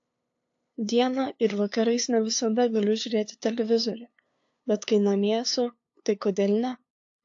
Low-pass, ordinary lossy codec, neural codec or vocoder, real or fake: 7.2 kHz; AAC, 48 kbps; codec, 16 kHz, 2 kbps, FunCodec, trained on LibriTTS, 25 frames a second; fake